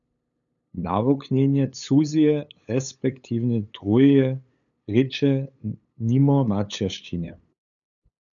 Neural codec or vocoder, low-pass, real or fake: codec, 16 kHz, 8 kbps, FunCodec, trained on LibriTTS, 25 frames a second; 7.2 kHz; fake